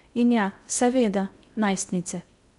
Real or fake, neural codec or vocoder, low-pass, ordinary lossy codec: fake; codec, 16 kHz in and 24 kHz out, 0.6 kbps, FocalCodec, streaming, 2048 codes; 10.8 kHz; none